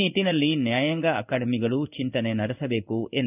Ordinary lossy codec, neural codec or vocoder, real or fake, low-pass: none; codec, 16 kHz in and 24 kHz out, 1 kbps, XY-Tokenizer; fake; 3.6 kHz